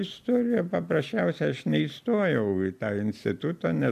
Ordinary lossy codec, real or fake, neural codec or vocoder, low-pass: MP3, 96 kbps; real; none; 14.4 kHz